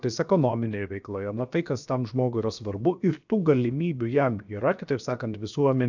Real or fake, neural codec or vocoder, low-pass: fake; codec, 16 kHz, 0.7 kbps, FocalCodec; 7.2 kHz